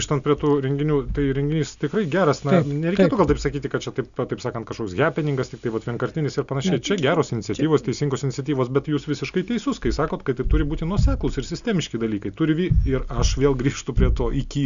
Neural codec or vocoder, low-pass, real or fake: none; 7.2 kHz; real